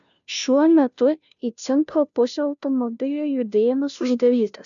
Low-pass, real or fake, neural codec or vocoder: 7.2 kHz; fake; codec, 16 kHz, 0.5 kbps, FunCodec, trained on Chinese and English, 25 frames a second